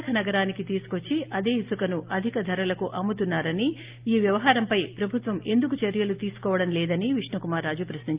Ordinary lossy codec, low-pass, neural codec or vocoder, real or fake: Opus, 32 kbps; 3.6 kHz; none; real